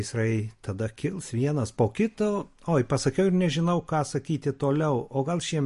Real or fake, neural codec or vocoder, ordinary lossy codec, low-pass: real; none; MP3, 48 kbps; 14.4 kHz